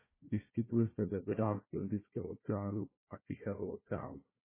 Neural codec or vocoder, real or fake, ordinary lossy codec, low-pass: codec, 16 kHz, 1 kbps, FunCodec, trained on Chinese and English, 50 frames a second; fake; MP3, 16 kbps; 3.6 kHz